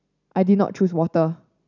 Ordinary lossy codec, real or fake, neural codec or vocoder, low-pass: none; real; none; 7.2 kHz